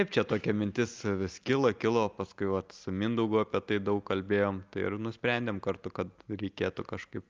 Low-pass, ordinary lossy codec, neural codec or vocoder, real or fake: 7.2 kHz; Opus, 24 kbps; none; real